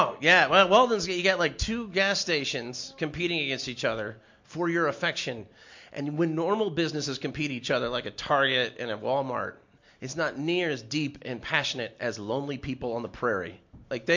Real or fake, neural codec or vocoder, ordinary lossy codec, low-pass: real; none; MP3, 48 kbps; 7.2 kHz